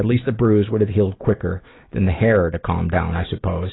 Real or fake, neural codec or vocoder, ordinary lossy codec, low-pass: real; none; AAC, 16 kbps; 7.2 kHz